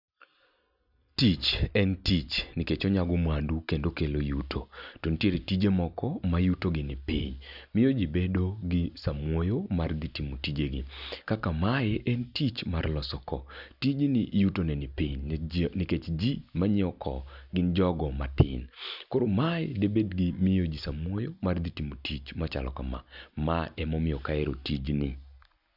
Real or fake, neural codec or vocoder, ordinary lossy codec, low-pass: real; none; none; 5.4 kHz